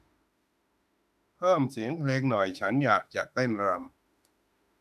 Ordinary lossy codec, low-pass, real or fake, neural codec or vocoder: none; 14.4 kHz; fake; autoencoder, 48 kHz, 32 numbers a frame, DAC-VAE, trained on Japanese speech